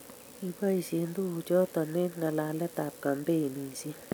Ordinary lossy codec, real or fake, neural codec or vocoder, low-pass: none; real; none; none